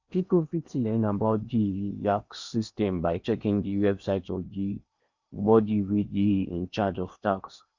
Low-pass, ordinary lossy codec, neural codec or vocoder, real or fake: 7.2 kHz; Opus, 64 kbps; codec, 16 kHz in and 24 kHz out, 0.8 kbps, FocalCodec, streaming, 65536 codes; fake